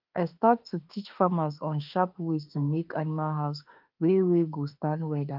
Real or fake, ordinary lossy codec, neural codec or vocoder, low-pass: fake; Opus, 24 kbps; autoencoder, 48 kHz, 32 numbers a frame, DAC-VAE, trained on Japanese speech; 5.4 kHz